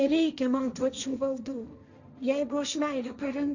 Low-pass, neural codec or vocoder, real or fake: 7.2 kHz; codec, 16 kHz, 1.1 kbps, Voila-Tokenizer; fake